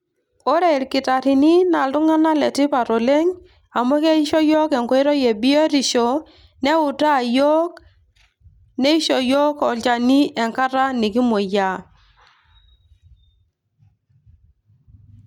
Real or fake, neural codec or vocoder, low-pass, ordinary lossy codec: real; none; 19.8 kHz; none